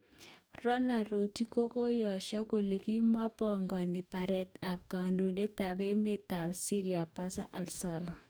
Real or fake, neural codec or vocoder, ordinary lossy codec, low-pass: fake; codec, 44.1 kHz, 2.6 kbps, DAC; none; none